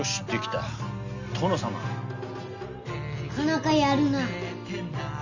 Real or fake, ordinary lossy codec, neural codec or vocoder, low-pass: real; none; none; 7.2 kHz